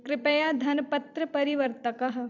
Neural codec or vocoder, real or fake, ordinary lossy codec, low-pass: vocoder, 44.1 kHz, 128 mel bands every 256 samples, BigVGAN v2; fake; none; 7.2 kHz